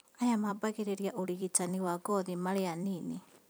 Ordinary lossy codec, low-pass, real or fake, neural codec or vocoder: none; none; fake; vocoder, 44.1 kHz, 128 mel bands every 256 samples, BigVGAN v2